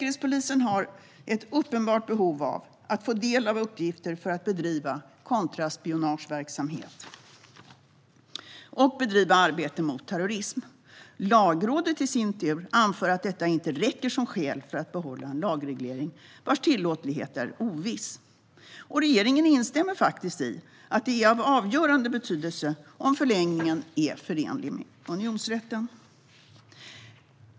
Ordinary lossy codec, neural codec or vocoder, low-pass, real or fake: none; none; none; real